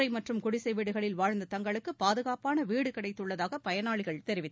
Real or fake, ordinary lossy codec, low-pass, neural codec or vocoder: real; none; none; none